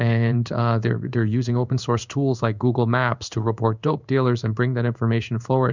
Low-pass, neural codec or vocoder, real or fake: 7.2 kHz; codec, 16 kHz in and 24 kHz out, 1 kbps, XY-Tokenizer; fake